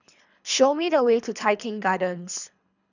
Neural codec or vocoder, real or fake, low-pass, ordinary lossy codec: codec, 24 kHz, 3 kbps, HILCodec; fake; 7.2 kHz; none